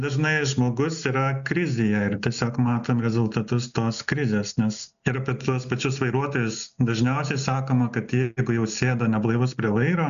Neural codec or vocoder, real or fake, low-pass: none; real; 7.2 kHz